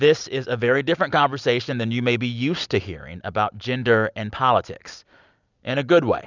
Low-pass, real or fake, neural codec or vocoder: 7.2 kHz; real; none